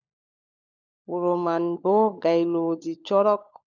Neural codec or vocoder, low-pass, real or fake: codec, 16 kHz, 16 kbps, FunCodec, trained on LibriTTS, 50 frames a second; 7.2 kHz; fake